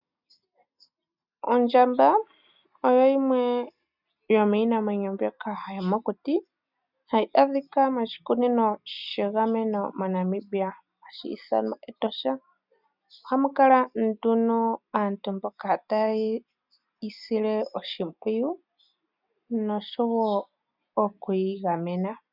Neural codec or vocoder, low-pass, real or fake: none; 5.4 kHz; real